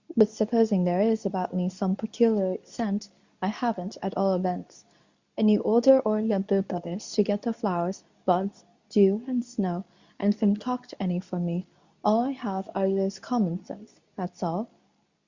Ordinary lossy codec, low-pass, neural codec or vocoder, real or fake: Opus, 64 kbps; 7.2 kHz; codec, 24 kHz, 0.9 kbps, WavTokenizer, medium speech release version 2; fake